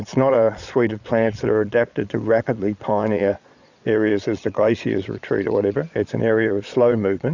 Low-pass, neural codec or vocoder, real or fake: 7.2 kHz; vocoder, 22.05 kHz, 80 mel bands, Vocos; fake